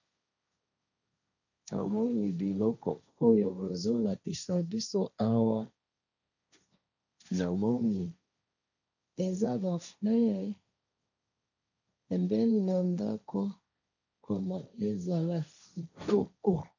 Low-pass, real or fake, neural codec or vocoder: 7.2 kHz; fake; codec, 16 kHz, 1.1 kbps, Voila-Tokenizer